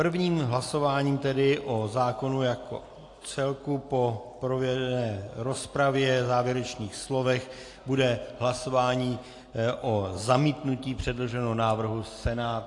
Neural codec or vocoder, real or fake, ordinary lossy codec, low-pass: none; real; AAC, 48 kbps; 10.8 kHz